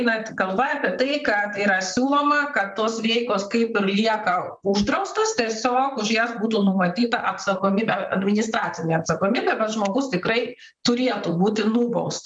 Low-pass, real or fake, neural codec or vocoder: 9.9 kHz; fake; vocoder, 44.1 kHz, 128 mel bands, Pupu-Vocoder